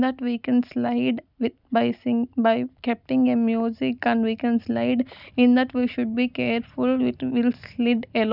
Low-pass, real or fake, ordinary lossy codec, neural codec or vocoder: 5.4 kHz; real; none; none